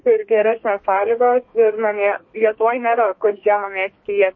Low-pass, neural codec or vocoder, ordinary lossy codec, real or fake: 7.2 kHz; codec, 32 kHz, 1.9 kbps, SNAC; MP3, 32 kbps; fake